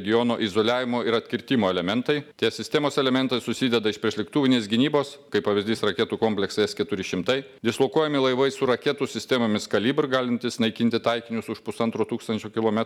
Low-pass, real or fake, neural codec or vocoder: 19.8 kHz; real; none